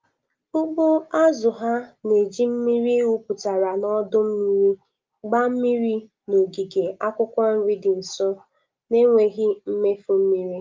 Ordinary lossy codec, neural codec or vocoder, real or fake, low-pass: Opus, 24 kbps; none; real; 7.2 kHz